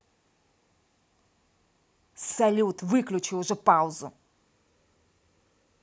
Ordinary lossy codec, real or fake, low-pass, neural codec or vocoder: none; real; none; none